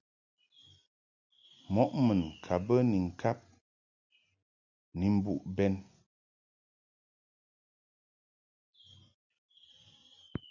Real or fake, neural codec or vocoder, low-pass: real; none; 7.2 kHz